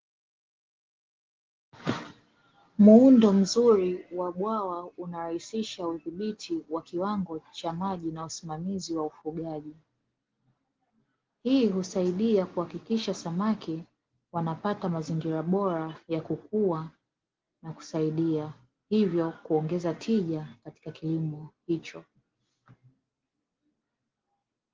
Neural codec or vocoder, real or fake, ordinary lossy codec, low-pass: none; real; Opus, 16 kbps; 7.2 kHz